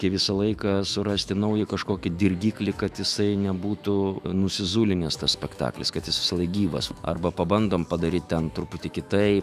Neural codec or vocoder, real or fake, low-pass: autoencoder, 48 kHz, 128 numbers a frame, DAC-VAE, trained on Japanese speech; fake; 14.4 kHz